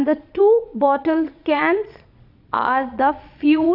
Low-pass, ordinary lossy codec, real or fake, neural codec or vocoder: 5.4 kHz; none; fake; vocoder, 22.05 kHz, 80 mel bands, Vocos